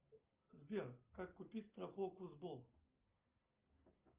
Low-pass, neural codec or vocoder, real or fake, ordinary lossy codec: 3.6 kHz; none; real; Opus, 24 kbps